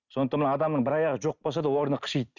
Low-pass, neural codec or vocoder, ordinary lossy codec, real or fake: 7.2 kHz; none; none; real